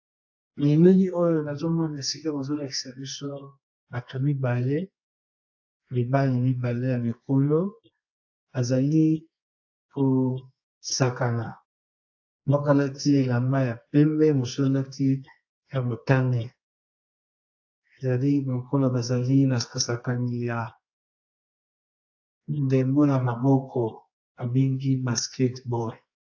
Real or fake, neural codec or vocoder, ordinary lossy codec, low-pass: fake; codec, 24 kHz, 0.9 kbps, WavTokenizer, medium music audio release; AAC, 48 kbps; 7.2 kHz